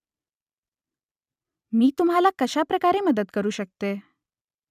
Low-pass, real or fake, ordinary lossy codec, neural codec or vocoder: 14.4 kHz; real; none; none